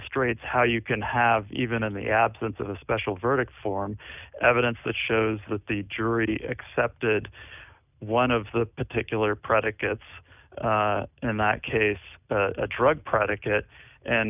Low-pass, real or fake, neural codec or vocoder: 3.6 kHz; real; none